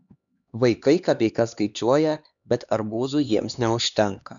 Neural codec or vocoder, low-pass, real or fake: codec, 16 kHz, 2 kbps, X-Codec, HuBERT features, trained on LibriSpeech; 7.2 kHz; fake